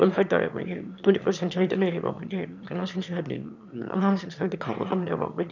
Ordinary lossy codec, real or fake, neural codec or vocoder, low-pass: none; fake; autoencoder, 22.05 kHz, a latent of 192 numbers a frame, VITS, trained on one speaker; 7.2 kHz